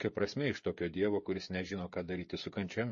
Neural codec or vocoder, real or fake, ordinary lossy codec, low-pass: codec, 16 kHz, 16 kbps, FreqCodec, smaller model; fake; MP3, 32 kbps; 7.2 kHz